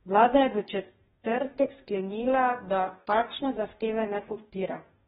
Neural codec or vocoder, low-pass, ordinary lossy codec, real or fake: codec, 44.1 kHz, 2.6 kbps, DAC; 19.8 kHz; AAC, 16 kbps; fake